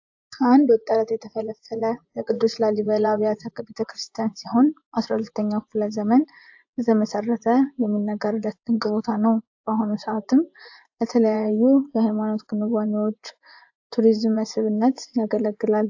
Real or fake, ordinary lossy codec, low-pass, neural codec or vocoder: fake; AAC, 48 kbps; 7.2 kHz; vocoder, 24 kHz, 100 mel bands, Vocos